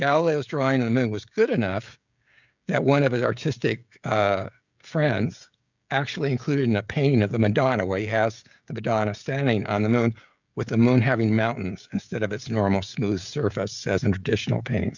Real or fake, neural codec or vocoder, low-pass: fake; codec, 16 kHz, 16 kbps, FreqCodec, smaller model; 7.2 kHz